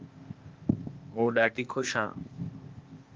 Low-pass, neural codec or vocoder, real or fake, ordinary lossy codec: 7.2 kHz; codec, 16 kHz, 0.8 kbps, ZipCodec; fake; Opus, 32 kbps